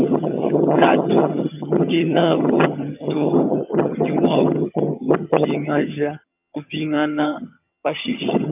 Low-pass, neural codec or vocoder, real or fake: 3.6 kHz; vocoder, 22.05 kHz, 80 mel bands, HiFi-GAN; fake